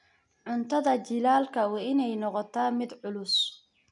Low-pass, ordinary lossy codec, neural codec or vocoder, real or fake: 10.8 kHz; none; none; real